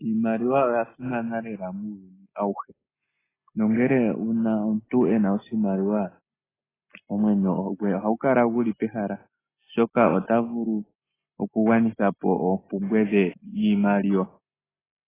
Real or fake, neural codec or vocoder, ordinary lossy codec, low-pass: real; none; AAC, 16 kbps; 3.6 kHz